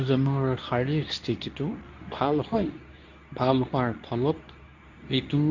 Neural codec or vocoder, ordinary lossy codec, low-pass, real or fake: codec, 24 kHz, 0.9 kbps, WavTokenizer, medium speech release version 2; none; 7.2 kHz; fake